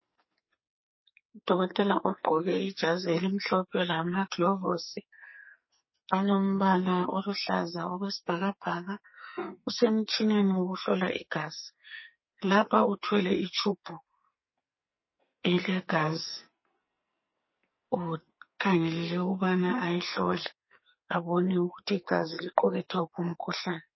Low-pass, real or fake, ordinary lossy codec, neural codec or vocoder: 7.2 kHz; fake; MP3, 24 kbps; codec, 44.1 kHz, 2.6 kbps, SNAC